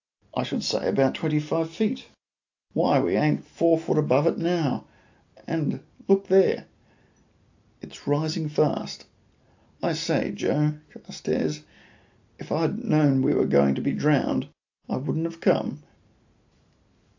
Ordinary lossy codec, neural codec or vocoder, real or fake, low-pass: AAC, 48 kbps; none; real; 7.2 kHz